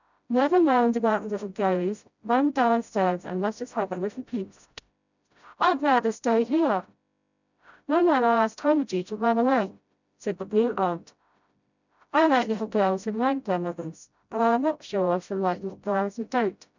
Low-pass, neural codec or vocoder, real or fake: 7.2 kHz; codec, 16 kHz, 0.5 kbps, FreqCodec, smaller model; fake